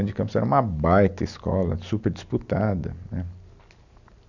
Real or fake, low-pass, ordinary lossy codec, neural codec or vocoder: real; 7.2 kHz; none; none